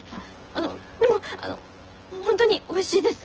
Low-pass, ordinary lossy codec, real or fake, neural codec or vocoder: 7.2 kHz; Opus, 16 kbps; fake; vocoder, 24 kHz, 100 mel bands, Vocos